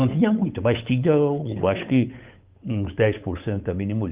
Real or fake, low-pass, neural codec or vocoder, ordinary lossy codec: fake; 3.6 kHz; codec, 16 kHz, 8 kbps, FunCodec, trained on Chinese and English, 25 frames a second; Opus, 32 kbps